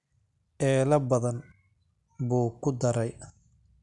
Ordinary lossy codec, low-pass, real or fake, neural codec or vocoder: none; 10.8 kHz; real; none